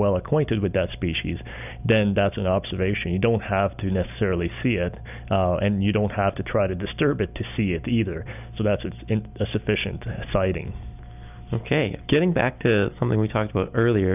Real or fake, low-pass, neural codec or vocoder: real; 3.6 kHz; none